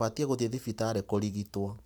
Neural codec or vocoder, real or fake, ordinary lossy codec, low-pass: vocoder, 44.1 kHz, 128 mel bands every 512 samples, BigVGAN v2; fake; none; none